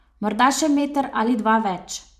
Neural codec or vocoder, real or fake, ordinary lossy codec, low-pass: vocoder, 44.1 kHz, 128 mel bands every 512 samples, BigVGAN v2; fake; none; 14.4 kHz